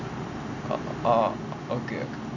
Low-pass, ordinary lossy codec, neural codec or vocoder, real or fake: 7.2 kHz; none; none; real